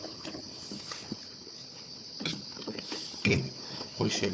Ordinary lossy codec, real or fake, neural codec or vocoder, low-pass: none; fake; codec, 16 kHz, 4 kbps, FunCodec, trained on Chinese and English, 50 frames a second; none